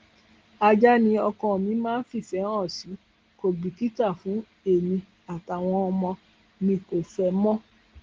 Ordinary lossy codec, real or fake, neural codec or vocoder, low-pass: Opus, 16 kbps; real; none; 7.2 kHz